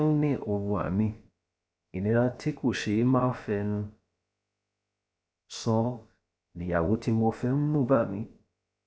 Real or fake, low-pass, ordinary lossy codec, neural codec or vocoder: fake; none; none; codec, 16 kHz, about 1 kbps, DyCAST, with the encoder's durations